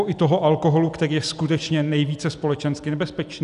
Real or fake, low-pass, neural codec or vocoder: real; 10.8 kHz; none